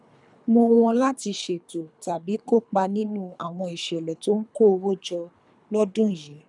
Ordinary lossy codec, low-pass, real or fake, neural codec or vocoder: none; 10.8 kHz; fake; codec, 24 kHz, 3 kbps, HILCodec